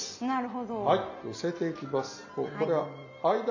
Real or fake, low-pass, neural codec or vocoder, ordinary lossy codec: real; 7.2 kHz; none; none